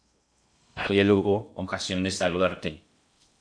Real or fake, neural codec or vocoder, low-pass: fake; codec, 16 kHz in and 24 kHz out, 0.6 kbps, FocalCodec, streaming, 2048 codes; 9.9 kHz